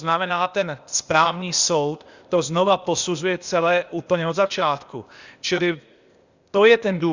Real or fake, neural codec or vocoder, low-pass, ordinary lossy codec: fake; codec, 16 kHz, 0.8 kbps, ZipCodec; 7.2 kHz; Opus, 64 kbps